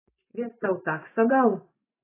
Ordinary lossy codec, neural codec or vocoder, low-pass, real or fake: AAC, 16 kbps; vocoder, 24 kHz, 100 mel bands, Vocos; 3.6 kHz; fake